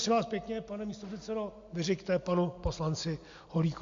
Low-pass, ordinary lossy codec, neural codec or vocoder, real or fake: 7.2 kHz; MP3, 48 kbps; none; real